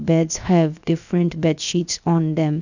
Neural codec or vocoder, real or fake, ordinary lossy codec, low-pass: codec, 16 kHz, 0.7 kbps, FocalCodec; fake; none; 7.2 kHz